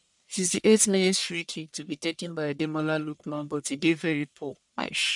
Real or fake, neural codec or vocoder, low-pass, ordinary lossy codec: fake; codec, 44.1 kHz, 1.7 kbps, Pupu-Codec; 10.8 kHz; none